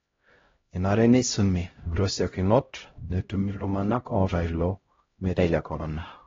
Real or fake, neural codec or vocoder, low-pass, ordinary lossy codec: fake; codec, 16 kHz, 0.5 kbps, X-Codec, HuBERT features, trained on LibriSpeech; 7.2 kHz; AAC, 24 kbps